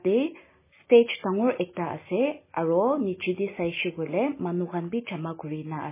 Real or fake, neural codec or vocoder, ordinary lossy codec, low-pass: real; none; MP3, 16 kbps; 3.6 kHz